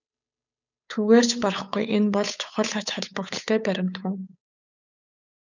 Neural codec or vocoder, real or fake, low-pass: codec, 16 kHz, 8 kbps, FunCodec, trained on Chinese and English, 25 frames a second; fake; 7.2 kHz